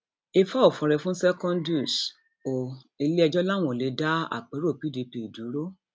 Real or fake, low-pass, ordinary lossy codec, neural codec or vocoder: real; none; none; none